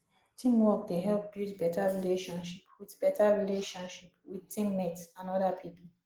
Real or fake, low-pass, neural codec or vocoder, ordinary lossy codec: real; 19.8 kHz; none; Opus, 16 kbps